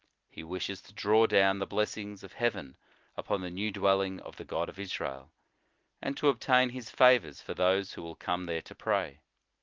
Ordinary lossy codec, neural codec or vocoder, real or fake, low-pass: Opus, 32 kbps; none; real; 7.2 kHz